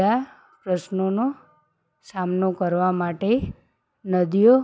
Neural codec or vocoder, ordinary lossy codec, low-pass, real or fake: none; none; none; real